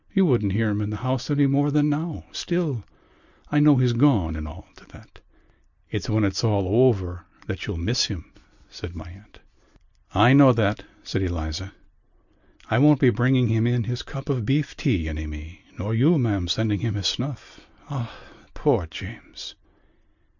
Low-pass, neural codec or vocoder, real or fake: 7.2 kHz; none; real